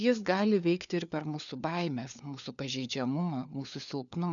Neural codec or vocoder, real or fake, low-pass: codec, 16 kHz, 4 kbps, FunCodec, trained on LibriTTS, 50 frames a second; fake; 7.2 kHz